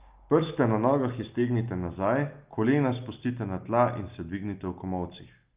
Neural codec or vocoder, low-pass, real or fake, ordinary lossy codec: none; 3.6 kHz; real; Opus, 24 kbps